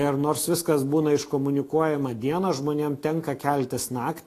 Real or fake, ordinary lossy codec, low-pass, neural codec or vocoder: fake; AAC, 48 kbps; 14.4 kHz; autoencoder, 48 kHz, 128 numbers a frame, DAC-VAE, trained on Japanese speech